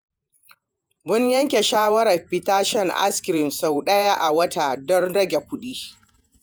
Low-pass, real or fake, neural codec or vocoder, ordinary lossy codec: none; fake; vocoder, 48 kHz, 128 mel bands, Vocos; none